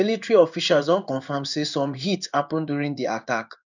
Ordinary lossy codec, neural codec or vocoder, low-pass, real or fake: none; codec, 16 kHz in and 24 kHz out, 1 kbps, XY-Tokenizer; 7.2 kHz; fake